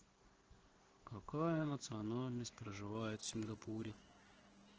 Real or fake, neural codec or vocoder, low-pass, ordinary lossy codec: fake; codec, 16 kHz in and 24 kHz out, 1 kbps, XY-Tokenizer; 7.2 kHz; Opus, 16 kbps